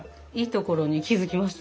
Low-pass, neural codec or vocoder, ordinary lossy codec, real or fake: none; none; none; real